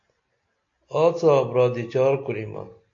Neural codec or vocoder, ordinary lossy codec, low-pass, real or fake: none; AAC, 48 kbps; 7.2 kHz; real